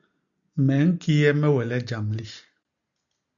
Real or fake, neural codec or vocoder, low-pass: real; none; 7.2 kHz